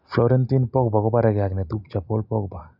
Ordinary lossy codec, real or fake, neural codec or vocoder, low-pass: none; real; none; 5.4 kHz